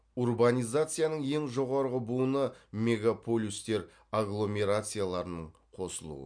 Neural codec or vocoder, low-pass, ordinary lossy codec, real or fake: none; 9.9 kHz; MP3, 64 kbps; real